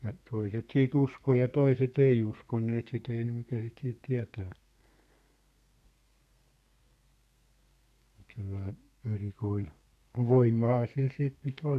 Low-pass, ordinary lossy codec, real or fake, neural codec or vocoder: 14.4 kHz; none; fake; codec, 32 kHz, 1.9 kbps, SNAC